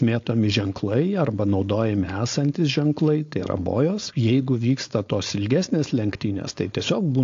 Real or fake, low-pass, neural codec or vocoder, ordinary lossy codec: fake; 7.2 kHz; codec, 16 kHz, 4.8 kbps, FACodec; AAC, 48 kbps